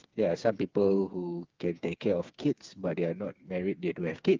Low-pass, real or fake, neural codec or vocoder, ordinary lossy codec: 7.2 kHz; fake; codec, 16 kHz, 4 kbps, FreqCodec, smaller model; Opus, 32 kbps